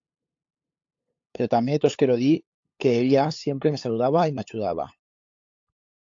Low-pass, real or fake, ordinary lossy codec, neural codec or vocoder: 7.2 kHz; fake; AAC, 48 kbps; codec, 16 kHz, 8 kbps, FunCodec, trained on LibriTTS, 25 frames a second